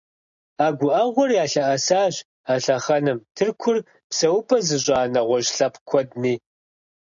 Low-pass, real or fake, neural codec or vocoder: 7.2 kHz; real; none